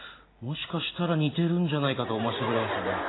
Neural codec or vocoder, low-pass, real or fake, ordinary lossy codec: none; 7.2 kHz; real; AAC, 16 kbps